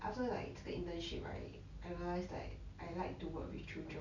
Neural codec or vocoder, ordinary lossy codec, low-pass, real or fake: none; none; 7.2 kHz; real